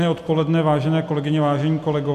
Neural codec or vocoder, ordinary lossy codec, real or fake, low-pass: none; MP3, 96 kbps; real; 14.4 kHz